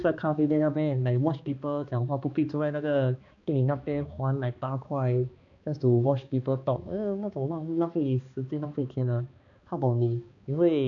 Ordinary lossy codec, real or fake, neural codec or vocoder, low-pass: none; fake; codec, 16 kHz, 2 kbps, X-Codec, HuBERT features, trained on balanced general audio; 7.2 kHz